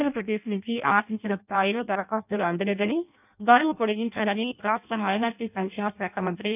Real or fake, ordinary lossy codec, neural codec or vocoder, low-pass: fake; none; codec, 16 kHz in and 24 kHz out, 0.6 kbps, FireRedTTS-2 codec; 3.6 kHz